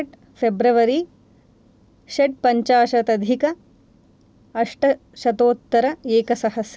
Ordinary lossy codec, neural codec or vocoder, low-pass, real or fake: none; none; none; real